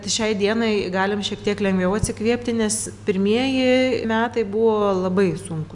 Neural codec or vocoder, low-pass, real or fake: none; 10.8 kHz; real